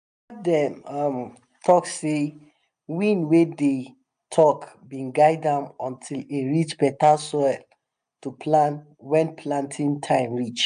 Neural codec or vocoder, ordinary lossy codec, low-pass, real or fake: none; AAC, 96 kbps; 9.9 kHz; real